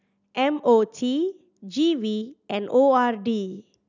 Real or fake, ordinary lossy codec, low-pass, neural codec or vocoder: real; none; 7.2 kHz; none